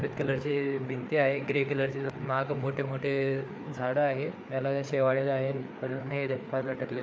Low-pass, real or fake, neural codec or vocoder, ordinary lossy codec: none; fake; codec, 16 kHz, 4 kbps, FreqCodec, larger model; none